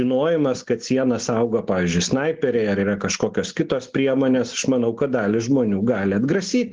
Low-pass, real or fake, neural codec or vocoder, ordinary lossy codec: 7.2 kHz; real; none; Opus, 16 kbps